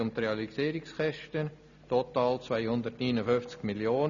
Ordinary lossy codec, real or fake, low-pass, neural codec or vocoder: MP3, 96 kbps; real; 7.2 kHz; none